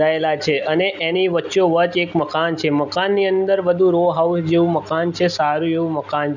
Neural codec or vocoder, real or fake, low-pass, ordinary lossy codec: none; real; 7.2 kHz; none